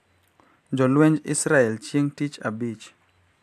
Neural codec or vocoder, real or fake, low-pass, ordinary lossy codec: none; real; 14.4 kHz; AAC, 96 kbps